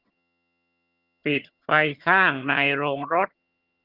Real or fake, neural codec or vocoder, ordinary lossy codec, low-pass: fake; vocoder, 22.05 kHz, 80 mel bands, HiFi-GAN; Opus, 32 kbps; 5.4 kHz